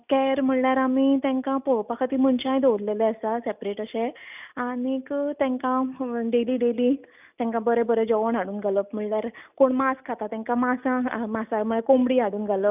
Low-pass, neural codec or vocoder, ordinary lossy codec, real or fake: 3.6 kHz; none; none; real